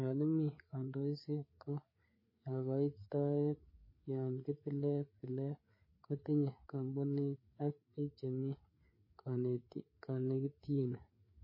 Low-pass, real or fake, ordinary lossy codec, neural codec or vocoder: 5.4 kHz; fake; MP3, 24 kbps; codec, 16 kHz, 4 kbps, FreqCodec, larger model